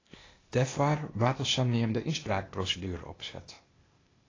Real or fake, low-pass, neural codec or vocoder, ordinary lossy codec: fake; 7.2 kHz; codec, 16 kHz, 0.8 kbps, ZipCodec; AAC, 32 kbps